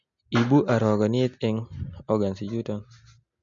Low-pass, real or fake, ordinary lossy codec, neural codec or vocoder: 7.2 kHz; real; MP3, 48 kbps; none